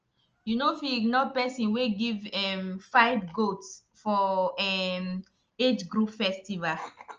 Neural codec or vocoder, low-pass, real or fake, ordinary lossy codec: none; 7.2 kHz; real; Opus, 24 kbps